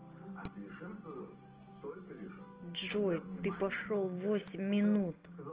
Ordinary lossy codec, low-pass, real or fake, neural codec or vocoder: Opus, 16 kbps; 3.6 kHz; real; none